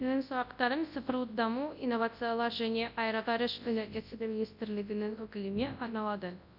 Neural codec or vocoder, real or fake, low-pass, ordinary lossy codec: codec, 24 kHz, 0.9 kbps, WavTokenizer, large speech release; fake; 5.4 kHz; none